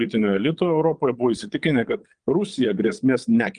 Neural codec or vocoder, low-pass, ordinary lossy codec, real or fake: vocoder, 22.05 kHz, 80 mel bands, Vocos; 9.9 kHz; Opus, 32 kbps; fake